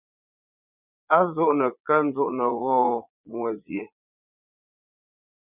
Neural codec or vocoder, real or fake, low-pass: vocoder, 22.05 kHz, 80 mel bands, Vocos; fake; 3.6 kHz